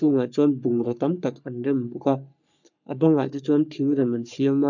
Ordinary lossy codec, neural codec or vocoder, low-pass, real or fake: none; codec, 44.1 kHz, 3.4 kbps, Pupu-Codec; 7.2 kHz; fake